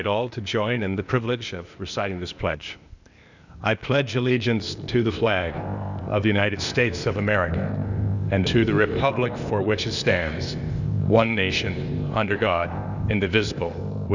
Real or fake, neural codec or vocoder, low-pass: fake; codec, 16 kHz, 0.8 kbps, ZipCodec; 7.2 kHz